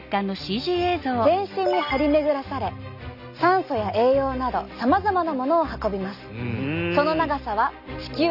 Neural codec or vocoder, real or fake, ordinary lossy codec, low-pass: none; real; none; 5.4 kHz